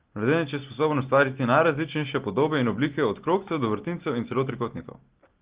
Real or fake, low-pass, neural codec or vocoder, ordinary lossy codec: real; 3.6 kHz; none; Opus, 16 kbps